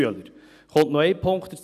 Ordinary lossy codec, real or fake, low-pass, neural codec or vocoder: none; real; 14.4 kHz; none